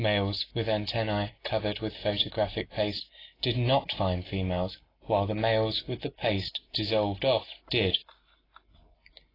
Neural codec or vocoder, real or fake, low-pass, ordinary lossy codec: none; real; 5.4 kHz; AAC, 24 kbps